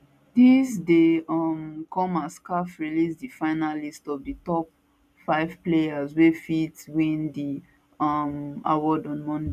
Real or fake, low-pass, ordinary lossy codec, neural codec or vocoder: real; 14.4 kHz; none; none